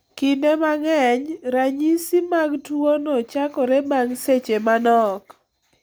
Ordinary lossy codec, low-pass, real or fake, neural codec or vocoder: none; none; real; none